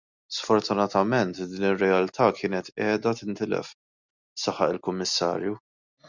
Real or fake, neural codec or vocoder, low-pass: real; none; 7.2 kHz